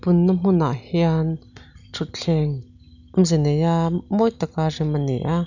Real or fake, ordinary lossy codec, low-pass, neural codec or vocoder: real; none; 7.2 kHz; none